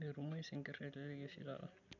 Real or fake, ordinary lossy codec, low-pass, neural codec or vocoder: real; none; 7.2 kHz; none